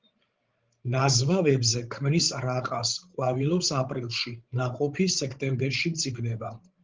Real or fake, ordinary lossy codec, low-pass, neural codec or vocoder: fake; Opus, 16 kbps; 7.2 kHz; codec, 16 kHz, 16 kbps, FreqCodec, larger model